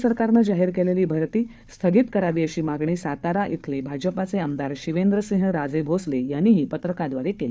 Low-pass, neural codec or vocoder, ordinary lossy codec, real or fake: none; codec, 16 kHz, 4 kbps, FunCodec, trained on Chinese and English, 50 frames a second; none; fake